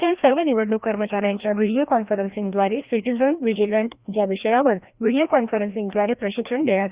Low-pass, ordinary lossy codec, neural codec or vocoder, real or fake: 3.6 kHz; Opus, 64 kbps; codec, 16 kHz, 1 kbps, FreqCodec, larger model; fake